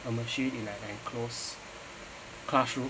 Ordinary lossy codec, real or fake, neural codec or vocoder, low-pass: none; real; none; none